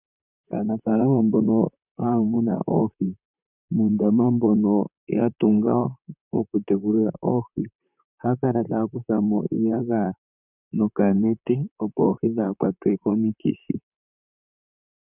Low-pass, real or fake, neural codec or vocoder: 3.6 kHz; fake; vocoder, 44.1 kHz, 128 mel bands, Pupu-Vocoder